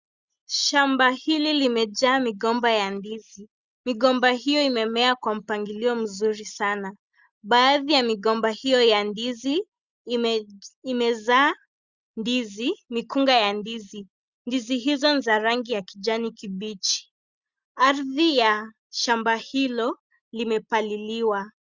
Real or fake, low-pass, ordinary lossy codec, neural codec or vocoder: real; 7.2 kHz; Opus, 64 kbps; none